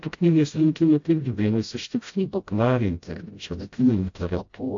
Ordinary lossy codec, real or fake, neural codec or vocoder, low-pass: AAC, 48 kbps; fake; codec, 16 kHz, 0.5 kbps, FreqCodec, smaller model; 7.2 kHz